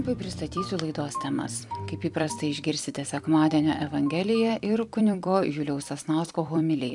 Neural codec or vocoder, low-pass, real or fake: vocoder, 44.1 kHz, 128 mel bands every 256 samples, BigVGAN v2; 10.8 kHz; fake